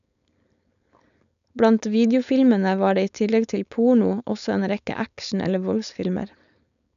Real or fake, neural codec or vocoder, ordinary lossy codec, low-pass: fake; codec, 16 kHz, 4.8 kbps, FACodec; none; 7.2 kHz